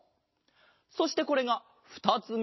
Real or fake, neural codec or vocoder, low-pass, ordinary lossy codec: real; none; 7.2 kHz; MP3, 24 kbps